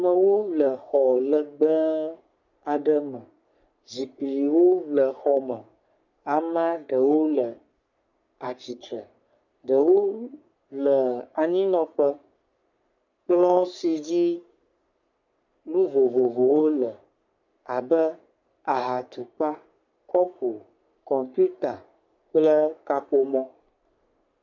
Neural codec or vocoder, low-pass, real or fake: codec, 44.1 kHz, 3.4 kbps, Pupu-Codec; 7.2 kHz; fake